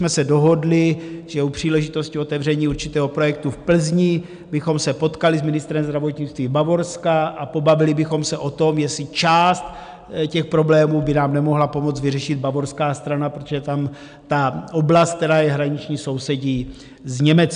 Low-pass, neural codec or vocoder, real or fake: 9.9 kHz; none; real